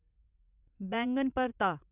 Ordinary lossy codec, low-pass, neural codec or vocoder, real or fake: none; 3.6 kHz; vocoder, 44.1 kHz, 80 mel bands, Vocos; fake